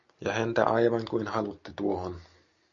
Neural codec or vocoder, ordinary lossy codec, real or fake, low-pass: none; MP3, 48 kbps; real; 7.2 kHz